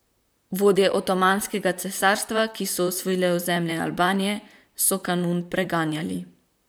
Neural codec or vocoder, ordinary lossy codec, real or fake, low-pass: vocoder, 44.1 kHz, 128 mel bands, Pupu-Vocoder; none; fake; none